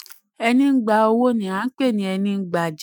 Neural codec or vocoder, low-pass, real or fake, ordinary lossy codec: autoencoder, 48 kHz, 128 numbers a frame, DAC-VAE, trained on Japanese speech; none; fake; none